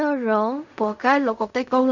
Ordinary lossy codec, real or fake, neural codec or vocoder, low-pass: none; fake; codec, 16 kHz in and 24 kHz out, 0.4 kbps, LongCat-Audio-Codec, fine tuned four codebook decoder; 7.2 kHz